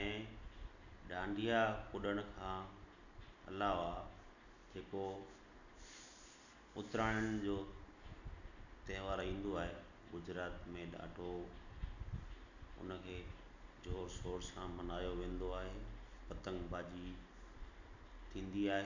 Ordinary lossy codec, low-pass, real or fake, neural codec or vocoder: none; 7.2 kHz; real; none